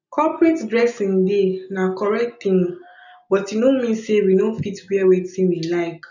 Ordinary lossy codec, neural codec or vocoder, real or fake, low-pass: none; none; real; 7.2 kHz